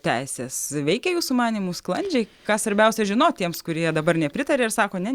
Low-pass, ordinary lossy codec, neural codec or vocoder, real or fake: 19.8 kHz; Opus, 64 kbps; none; real